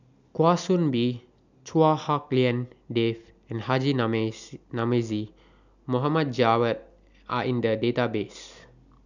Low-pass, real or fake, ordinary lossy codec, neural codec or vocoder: 7.2 kHz; real; none; none